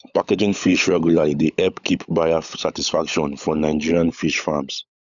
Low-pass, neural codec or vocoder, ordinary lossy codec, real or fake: 7.2 kHz; codec, 16 kHz, 16 kbps, FunCodec, trained on LibriTTS, 50 frames a second; none; fake